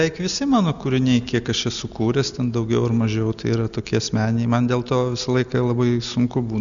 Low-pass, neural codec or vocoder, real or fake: 7.2 kHz; none; real